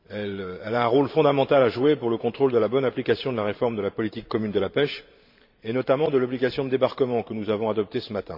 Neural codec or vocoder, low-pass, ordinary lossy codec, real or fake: vocoder, 44.1 kHz, 128 mel bands every 512 samples, BigVGAN v2; 5.4 kHz; MP3, 48 kbps; fake